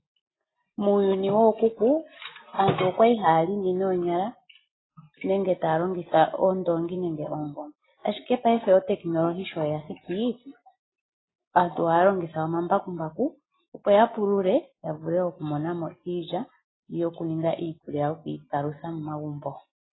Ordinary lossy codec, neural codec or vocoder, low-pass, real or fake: AAC, 16 kbps; none; 7.2 kHz; real